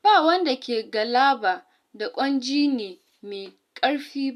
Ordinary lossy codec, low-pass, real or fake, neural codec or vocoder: none; 14.4 kHz; real; none